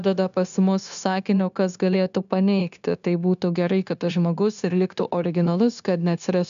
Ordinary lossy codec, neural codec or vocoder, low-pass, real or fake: MP3, 96 kbps; codec, 16 kHz, 0.9 kbps, LongCat-Audio-Codec; 7.2 kHz; fake